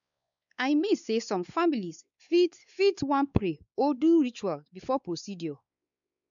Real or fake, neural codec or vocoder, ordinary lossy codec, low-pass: fake; codec, 16 kHz, 4 kbps, X-Codec, WavLM features, trained on Multilingual LibriSpeech; none; 7.2 kHz